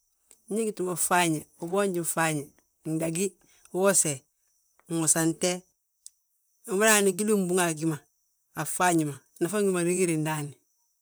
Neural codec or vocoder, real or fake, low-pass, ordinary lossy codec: vocoder, 44.1 kHz, 128 mel bands, Pupu-Vocoder; fake; none; none